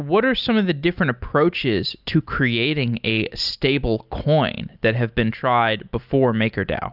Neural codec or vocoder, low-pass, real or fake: none; 5.4 kHz; real